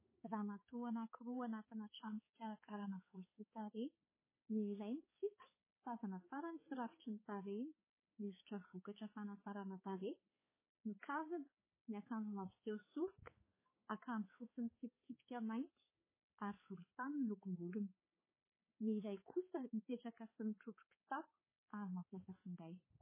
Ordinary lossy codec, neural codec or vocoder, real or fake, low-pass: MP3, 16 kbps; codec, 16 kHz, 4 kbps, X-Codec, HuBERT features, trained on balanced general audio; fake; 3.6 kHz